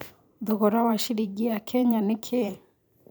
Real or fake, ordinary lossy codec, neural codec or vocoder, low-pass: fake; none; vocoder, 44.1 kHz, 128 mel bands every 512 samples, BigVGAN v2; none